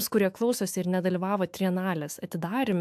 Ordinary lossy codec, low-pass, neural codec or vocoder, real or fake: AAC, 96 kbps; 14.4 kHz; autoencoder, 48 kHz, 128 numbers a frame, DAC-VAE, trained on Japanese speech; fake